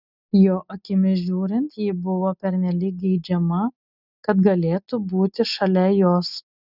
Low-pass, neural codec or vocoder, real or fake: 5.4 kHz; none; real